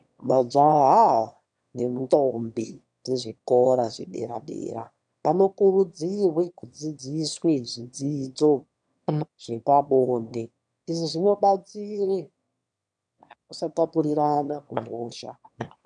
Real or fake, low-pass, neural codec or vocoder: fake; 9.9 kHz; autoencoder, 22.05 kHz, a latent of 192 numbers a frame, VITS, trained on one speaker